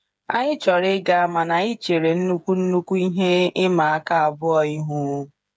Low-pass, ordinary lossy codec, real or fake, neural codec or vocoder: none; none; fake; codec, 16 kHz, 8 kbps, FreqCodec, smaller model